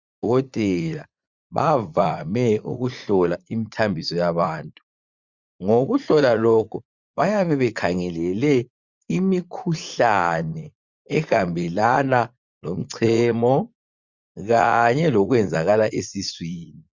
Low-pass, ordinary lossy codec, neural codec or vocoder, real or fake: 7.2 kHz; Opus, 64 kbps; vocoder, 44.1 kHz, 80 mel bands, Vocos; fake